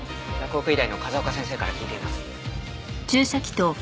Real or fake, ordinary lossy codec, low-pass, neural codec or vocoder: real; none; none; none